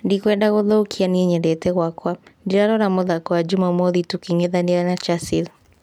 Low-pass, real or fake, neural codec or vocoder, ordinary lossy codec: 19.8 kHz; real; none; none